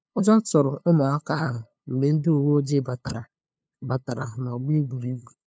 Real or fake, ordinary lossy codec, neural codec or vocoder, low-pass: fake; none; codec, 16 kHz, 2 kbps, FunCodec, trained on LibriTTS, 25 frames a second; none